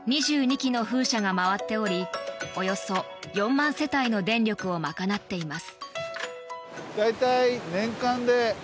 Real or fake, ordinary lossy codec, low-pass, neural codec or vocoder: real; none; none; none